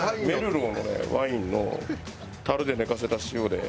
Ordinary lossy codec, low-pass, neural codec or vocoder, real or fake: none; none; none; real